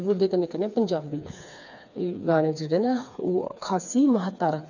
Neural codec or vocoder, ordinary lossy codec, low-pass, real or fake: codec, 16 kHz, 4 kbps, FreqCodec, smaller model; none; 7.2 kHz; fake